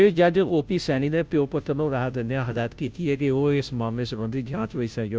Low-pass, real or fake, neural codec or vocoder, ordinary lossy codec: none; fake; codec, 16 kHz, 0.5 kbps, FunCodec, trained on Chinese and English, 25 frames a second; none